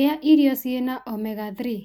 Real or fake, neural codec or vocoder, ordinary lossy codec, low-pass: real; none; none; 19.8 kHz